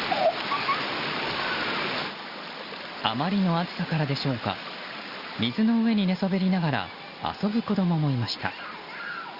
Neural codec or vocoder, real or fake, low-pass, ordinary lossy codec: none; real; 5.4 kHz; Opus, 64 kbps